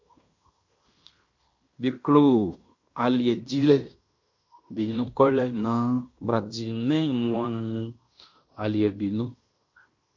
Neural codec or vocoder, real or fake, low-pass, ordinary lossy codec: codec, 16 kHz in and 24 kHz out, 0.9 kbps, LongCat-Audio-Codec, fine tuned four codebook decoder; fake; 7.2 kHz; MP3, 48 kbps